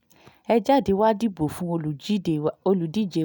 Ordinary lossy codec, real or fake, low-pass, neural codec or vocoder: none; real; none; none